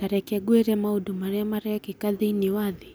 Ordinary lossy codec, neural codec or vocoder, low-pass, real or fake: none; none; none; real